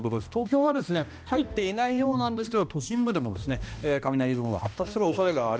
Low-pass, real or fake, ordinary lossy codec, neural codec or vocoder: none; fake; none; codec, 16 kHz, 1 kbps, X-Codec, HuBERT features, trained on balanced general audio